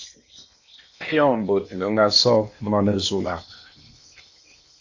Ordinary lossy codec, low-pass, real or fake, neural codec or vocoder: AAC, 48 kbps; 7.2 kHz; fake; codec, 16 kHz in and 24 kHz out, 0.8 kbps, FocalCodec, streaming, 65536 codes